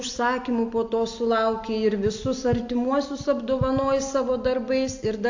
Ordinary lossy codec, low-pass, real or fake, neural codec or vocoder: AAC, 48 kbps; 7.2 kHz; real; none